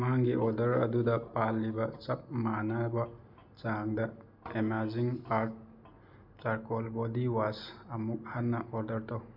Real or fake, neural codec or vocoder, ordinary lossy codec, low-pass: real; none; none; 5.4 kHz